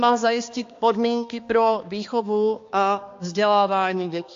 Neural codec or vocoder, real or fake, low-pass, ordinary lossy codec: codec, 16 kHz, 2 kbps, X-Codec, HuBERT features, trained on balanced general audio; fake; 7.2 kHz; AAC, 48 kbps